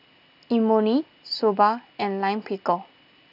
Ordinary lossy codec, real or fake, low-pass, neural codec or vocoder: none; real; 5.4 kHz; none